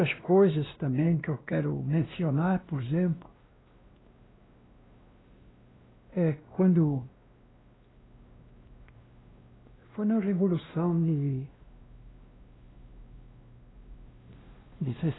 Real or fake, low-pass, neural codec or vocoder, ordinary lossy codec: fake; 7.2 kHz; codec, 16 kHz, 1 kbps, X-Codec, WavLM features, trained on Multilingual LibriSpeech; AAC, 16 kbps